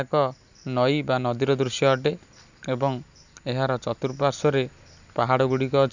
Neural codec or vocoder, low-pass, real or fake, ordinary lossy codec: none; 7.2 kHz; real; none